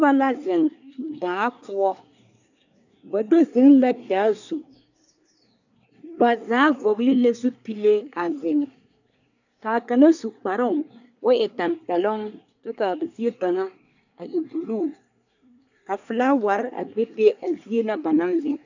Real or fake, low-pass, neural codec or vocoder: fake; 7.2 kHz; codec, 24 kHz, 1 kbps, SNAC